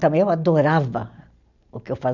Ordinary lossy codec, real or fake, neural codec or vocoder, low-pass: none; real; none; 7.2 kHz